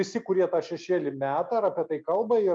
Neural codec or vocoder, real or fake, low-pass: none; real; 9.9 kHz